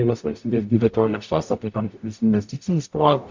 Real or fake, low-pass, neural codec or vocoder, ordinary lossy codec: fake; 7.2 kHz; codec, 44.1 kHz, 0.9 kbps, DAC; MP3, 48 kbps